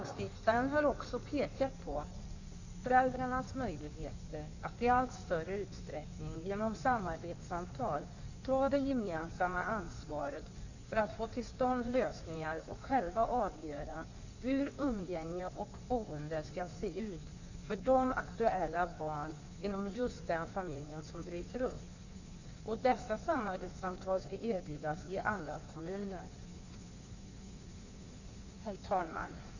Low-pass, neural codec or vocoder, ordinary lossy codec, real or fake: 7.2 kHz; codec, 16 kHz in and 24 kHz out, 1.1 kbps, FireRedTTS-2 codec; none; fake